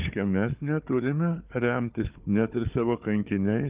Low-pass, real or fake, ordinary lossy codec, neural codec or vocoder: 3.6 kHz; fake; Opus, 24 kbps; codec, 16 kHz, 4 kbps, FunCodec, trained on Chinese and English, 50 frames a second